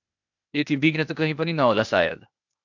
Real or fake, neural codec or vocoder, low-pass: fake; codec, 16 kHz, 0.8 kbps, ZipCodec; 7.2 kHz